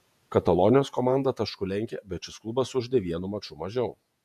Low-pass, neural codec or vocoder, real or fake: 14.4 kHz; vocoder, 48 kHz, 128 mel bands, Vocos; fake